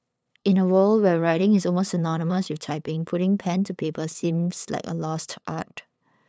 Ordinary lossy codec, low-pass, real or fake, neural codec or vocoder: none; none; fake; codec, 16 kHz, 8 kbps, FunCodec, trained on LibriTTS, 25 frames a second